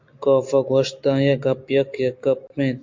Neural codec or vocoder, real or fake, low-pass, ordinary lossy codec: none; real; 7.2 kHz; MP3, 48 kbps